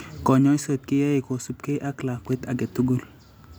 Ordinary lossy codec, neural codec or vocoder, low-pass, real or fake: none; none; none; real